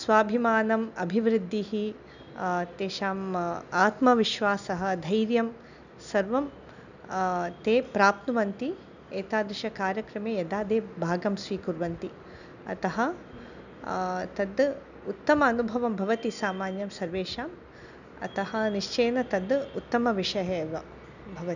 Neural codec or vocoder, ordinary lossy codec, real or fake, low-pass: none; none; real; 7.2 kHz